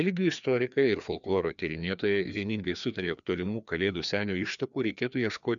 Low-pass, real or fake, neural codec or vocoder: 7.2 kHz; fake; codec, 16 kHz, 2 kbps, FreqCodec, larger model